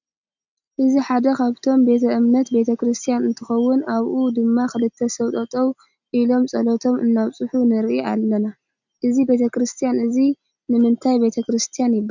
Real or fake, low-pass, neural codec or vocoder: real; 7.2 kHz; none